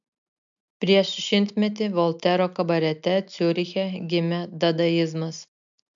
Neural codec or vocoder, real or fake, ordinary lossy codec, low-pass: none; real; MP3, 64 kbps; 7.2 kHz